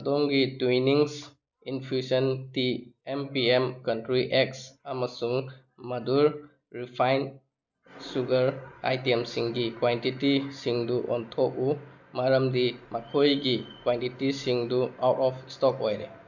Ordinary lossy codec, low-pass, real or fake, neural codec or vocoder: none; 7.2 kHz; real; none